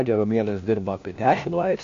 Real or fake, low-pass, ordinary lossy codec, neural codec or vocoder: fake; 7.2 kHz; MP3, 48 kbps; codec, 16 kHz, 1 kbps, FunCodec, trained on LibriTTS, 50 frames a second